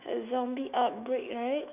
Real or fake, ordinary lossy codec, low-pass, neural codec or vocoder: fake; none; 3.6 kHz; autoencoder, 48 kHz, 128 numbers a frame, DAC-VAE, trained on Japanese speech